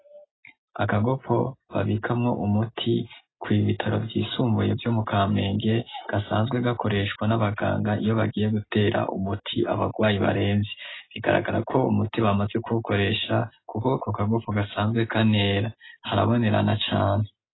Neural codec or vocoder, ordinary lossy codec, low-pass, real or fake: none; AAC, 16 kbps; 7.2 kHz; real